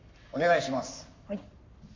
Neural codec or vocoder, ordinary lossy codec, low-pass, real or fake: codec, 44.1 kHz, 7.8 kbps, Pupu-Codec; MP3, 64 kbps; 7.2 kHz; fake